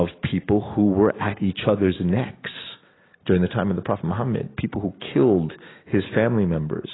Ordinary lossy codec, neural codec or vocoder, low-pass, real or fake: AAC, 16 kbps; none; 7.2 kHz; real